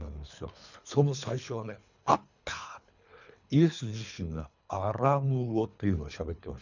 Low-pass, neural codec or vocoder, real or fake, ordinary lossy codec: 7.2 kHz; codec, 24 kHz, 3 kbps, HILCodec; fake; none